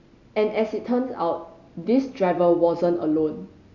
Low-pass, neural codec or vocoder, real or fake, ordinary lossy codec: 7.2 kHz; none; real; none